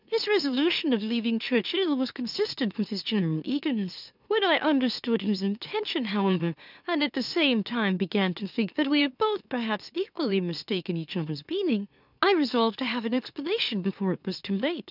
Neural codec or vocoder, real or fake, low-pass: autoencoder, 44.1 kHz, a latent of 192 numbers a frame, MeloTTS; fake; 5.4 kHz